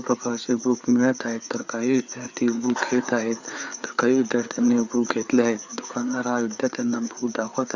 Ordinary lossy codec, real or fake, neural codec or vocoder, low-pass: Opus, 64 kbps; fake; codec, 16 kHz, 4 kbps, FreqCodec, larger model; 7.2 kHz